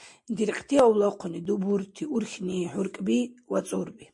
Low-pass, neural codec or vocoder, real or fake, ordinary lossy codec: 10.8 kHz; none; real; MP3, 48 kbps